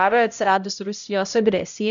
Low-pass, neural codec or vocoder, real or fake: 7.2 kHz; codec, 16 kHz, 0.5 kbps, X-Codec, HuBERT features, trained on LibriSpeech; fake